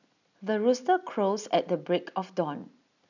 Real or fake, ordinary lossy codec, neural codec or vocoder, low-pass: real; none; none; 7.2 kHz